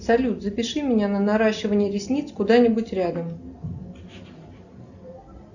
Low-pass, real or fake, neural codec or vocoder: 7.2 kHz; real; none